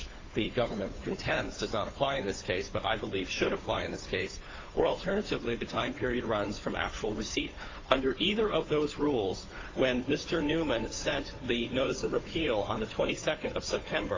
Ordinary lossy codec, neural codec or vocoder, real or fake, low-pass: AAC, 32 kbps; codec, 16 kHz, 2 kbps, FunCodec, trained on Chinese and English, 25 frames a second; fake; 7.2 kHz